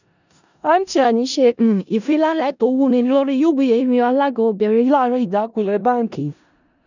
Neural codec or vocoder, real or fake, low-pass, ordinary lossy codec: codec, 16 kHz in and 24 kHz out, 0.4 kbps, LongCat-Audio-Codec, four codebook decoder; fake; 7.2 kHz; none